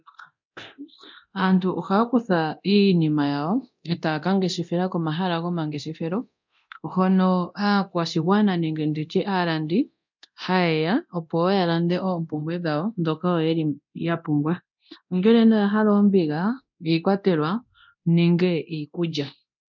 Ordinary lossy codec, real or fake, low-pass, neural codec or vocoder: MP3, 64 kbps; fake; 7.2 kHz; codec, 24 kHz, 0.9 kbps, DualCodec